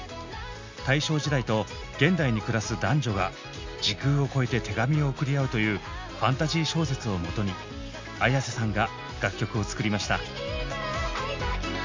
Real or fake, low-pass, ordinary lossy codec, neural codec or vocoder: real; 7.2 kHz; MP3, 64 kbps; none